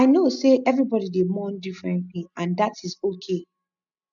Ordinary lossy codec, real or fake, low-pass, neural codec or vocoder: none; real; 7.2 kHz; none